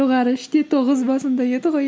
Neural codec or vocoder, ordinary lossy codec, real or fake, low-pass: none; none; real; none